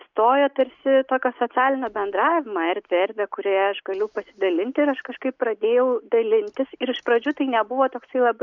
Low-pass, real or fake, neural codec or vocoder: 7.2 kHz; real; none